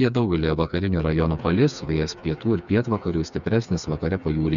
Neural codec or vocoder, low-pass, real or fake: codec, 16 kHz, 4 kbps, FreqCodec, smaller model; 7.2 kHz; fake